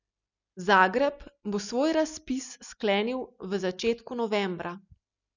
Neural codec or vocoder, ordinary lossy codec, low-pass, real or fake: none; none; 7.2 kHz; real